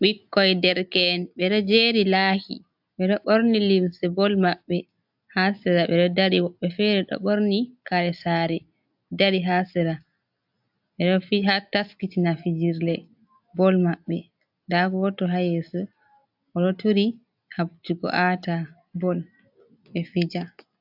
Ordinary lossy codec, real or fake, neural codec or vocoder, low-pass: AAC, 48 kbps; real; none; 5.4 kHz